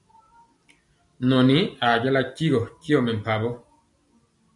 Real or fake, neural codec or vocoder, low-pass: real; none; 10.8 kHz